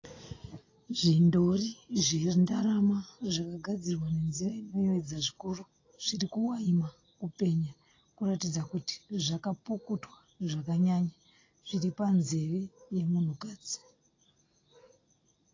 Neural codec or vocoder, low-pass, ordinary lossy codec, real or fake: none; 7.2 kHz; AAC, 32 kbps; real